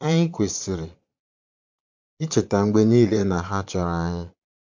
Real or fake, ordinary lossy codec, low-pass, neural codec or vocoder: fake; MP3, 48 kbps; 7.2 kHz; vocoder, 22.05 kHz, 80 mel bands, Vocos